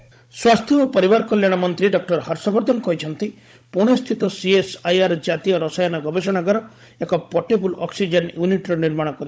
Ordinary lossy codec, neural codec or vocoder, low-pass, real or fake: none; codec, 16 kHz, 16 kbps, FunCodec, trained on Chinese and English, 50 frames a second; none; fake